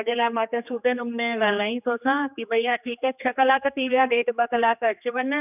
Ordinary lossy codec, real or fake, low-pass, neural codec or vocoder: none; fake; 3.6 kHz; codec, 16 kHz, 2 kbps, X-Codec, HuBERT features, trained on general audio